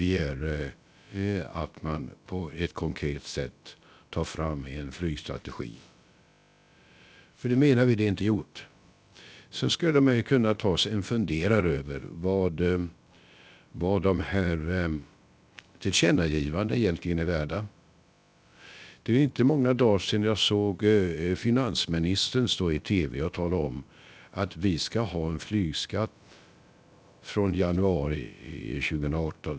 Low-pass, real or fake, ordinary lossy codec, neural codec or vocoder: none; fake; none; codec, 16 kHz, about 1 kbps, DyCAST, with the encoder's durations